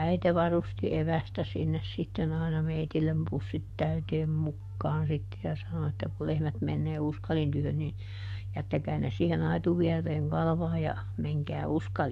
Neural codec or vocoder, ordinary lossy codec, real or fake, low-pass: codec, 44.1 kHz, 7.8 kbps, DAC; MP3, 64 kbps; fake; 14.4 kHz